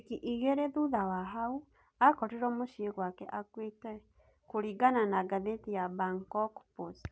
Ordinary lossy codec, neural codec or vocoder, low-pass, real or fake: none; none; none; real